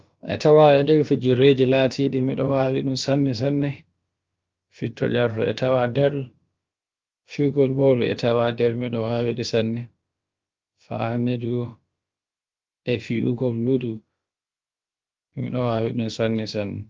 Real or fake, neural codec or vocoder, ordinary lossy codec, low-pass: fake; codec, 16 kHz, about 1 kbps, DyCAST, with the encoder's durations; Opus, 32 kbps; 7.2 kHz